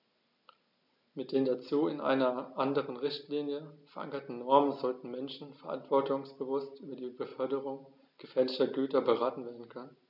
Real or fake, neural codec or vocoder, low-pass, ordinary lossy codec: real; none; 5.4 kHz; none